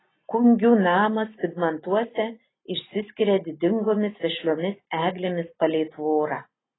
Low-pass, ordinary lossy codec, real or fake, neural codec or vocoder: 7.2 kHz; AAC, 16 kbps; real; none